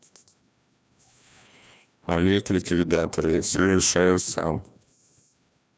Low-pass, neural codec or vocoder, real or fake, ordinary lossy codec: none; codec, 16 kHz, 1 kbps, FreqCodec, larger model; fake; none